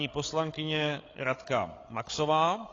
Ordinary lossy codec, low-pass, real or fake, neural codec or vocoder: AAC, 32 kbps; 7.2 kHz; fake; codec, 16 kHz, 8 kbps, FreqCodec, larger model